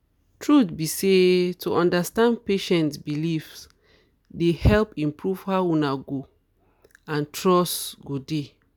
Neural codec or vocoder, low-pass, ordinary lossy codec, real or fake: none; none; none; real